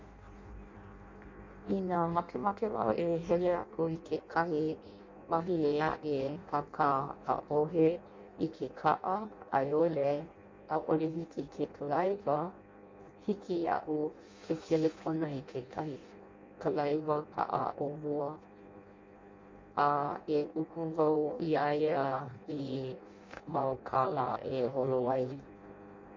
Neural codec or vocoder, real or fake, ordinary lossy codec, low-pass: codec, 16 kHz in and 24 kHz out, 0.6 kbps, FireRedTTS-2 codec; fake; MP3, 48 kbps; 7.2 kHz